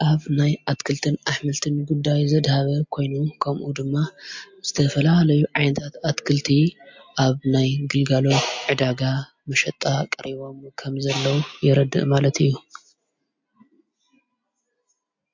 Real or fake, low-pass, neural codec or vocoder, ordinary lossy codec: real; 7.2 kHz; none; MP3, 48 kbps